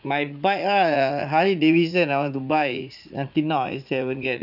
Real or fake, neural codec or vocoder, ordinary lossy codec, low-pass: fake; vocoder, 44.1 kHz, 80 mel bands, Vocos; none; 5.4 kHz